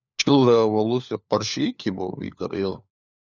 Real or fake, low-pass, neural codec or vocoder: fake; 7.2 kHz; codec, 16 kHz, 4 kbps, FunCodec, trained on LibriTTS, 50 frames a second